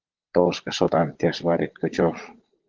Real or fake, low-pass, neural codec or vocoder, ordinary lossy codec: fake; 7.2 kHz; vocoder, 22.05 kHz, 80 mel bands, Vocos; Opus, 16 kbps